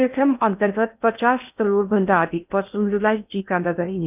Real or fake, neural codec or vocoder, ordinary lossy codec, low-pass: fake; codec, 16 kHz in and 24 kHz out, 0.6 kbps, FocalCodec, streaming, 4096 codes; none; 3.6 kHz